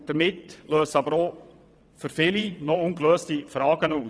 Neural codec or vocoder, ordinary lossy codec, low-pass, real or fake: vocoder, 22.05 kHz, 80 mel bands, WaveNeXt; none; none; fake